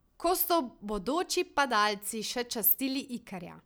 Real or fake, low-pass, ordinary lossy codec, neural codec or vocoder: real; none; none; none